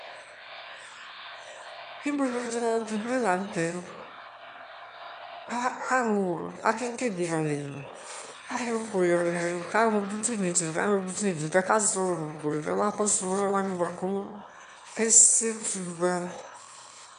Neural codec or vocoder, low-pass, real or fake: autoencoder, 22.05 kHz, a latent of 192 numbers a frame, VITS, trained on one speaker; 9.9 kHz; fake